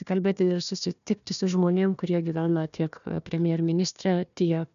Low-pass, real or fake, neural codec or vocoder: 7.2 kHz; fake; codec, 16 kHz, 1 kbps, FunCodec, trained on Chinese and English, 50 frames a second